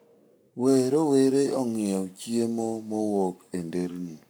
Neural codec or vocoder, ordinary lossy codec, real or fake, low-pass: codec, 44.1 kHz, 7.8 kbps, Pupu-Codec; none; fake; none